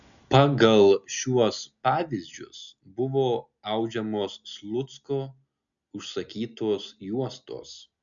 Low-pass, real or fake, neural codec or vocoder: 7.2 kHz; real; none